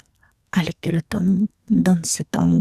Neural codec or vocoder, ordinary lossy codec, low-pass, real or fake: codec, 44.1 kHz, 2.6 kbps, SNAC; Opus, 64 kbps; 14.4 kHz; fake